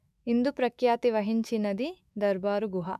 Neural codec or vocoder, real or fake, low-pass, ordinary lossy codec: autoencoder, 48 kHz, 128 numbers a frame, DAC-VAE, trained on Japanese speech; fake; 14.4 kHz; none